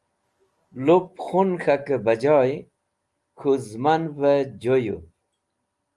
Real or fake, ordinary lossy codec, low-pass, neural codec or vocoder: real; Opus, 32 kbps; 10.8 kHz; none